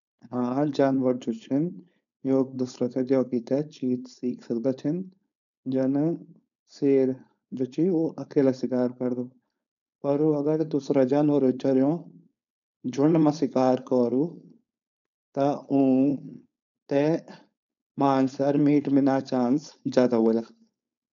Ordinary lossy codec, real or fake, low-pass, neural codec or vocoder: none; fake; 7.2 kHz; codec, 16 kHz, 4.8 kbps, FACodec